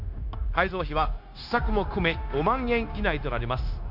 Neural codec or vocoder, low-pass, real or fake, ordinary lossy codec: codec, 16 kHz, 0.9 kbps, LongCat-Audio-Codec; 5.4 kHz; fake; none